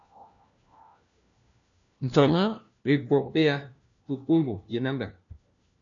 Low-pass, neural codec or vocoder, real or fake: 7.2 kHz; codec, 16 kHz, 1 kbps, FunCodec, trained on LibriTTS, 50 frames a second; fake